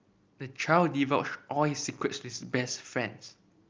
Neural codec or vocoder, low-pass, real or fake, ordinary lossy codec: none; 7.2 kHz; real; Opus, 24 kbps